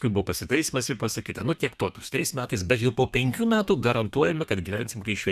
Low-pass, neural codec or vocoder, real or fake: 14.4 kHz; codec, 32 kHz, 1.9 kbps, SNAC; fake